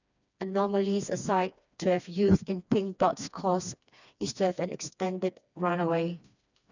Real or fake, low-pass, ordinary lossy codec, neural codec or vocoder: fake; 7.2 kHz; none; codec, 16 kHz, 2 kbps, FreqCodec, smaller model